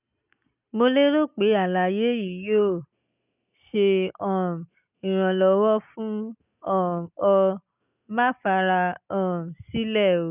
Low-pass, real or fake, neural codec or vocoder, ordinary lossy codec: 3.6 kHz; real; none; none